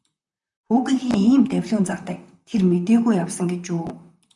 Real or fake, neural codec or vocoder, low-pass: fake; vocoder, 44.1 kHz, 128 mel bands, Pupu-Vocoder; 10.8 kHz